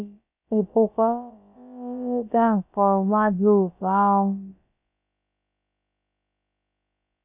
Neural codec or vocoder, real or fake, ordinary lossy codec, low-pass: codec, 16 kHz, about 1 kbps, DyCAST, with the encoder's durations; fake; none; 3.6 kHz